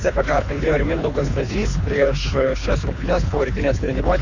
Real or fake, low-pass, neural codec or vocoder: fake; 7.2 kHz; codec, 24 kHz, 3 kbps, HILCodec